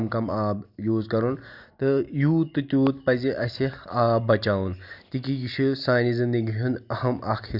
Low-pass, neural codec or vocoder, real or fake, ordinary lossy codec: 5.4 kHz; none; real; Opus, 64 kbps